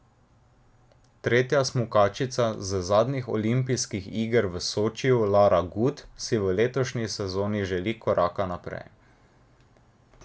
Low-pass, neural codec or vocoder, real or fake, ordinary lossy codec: none; none; real; none